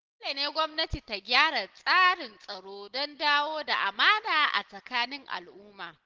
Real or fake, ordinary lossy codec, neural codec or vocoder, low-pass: real; Opus, 16 kbps; none; 7.2 kHz